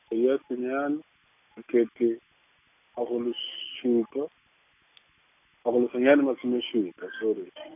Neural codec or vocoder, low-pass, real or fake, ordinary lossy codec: none; 3.6 kHz; real; none